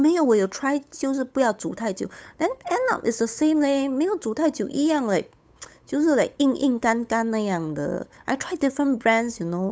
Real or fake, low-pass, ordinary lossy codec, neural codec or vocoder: fake; none; none; codec, 16 kHz, 8 kbps, FunCodec, trained on Chinese and English, 25 frames a second